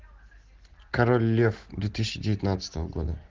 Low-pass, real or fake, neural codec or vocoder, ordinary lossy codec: 7.2 kHz; real; none; Opus, 16 kbps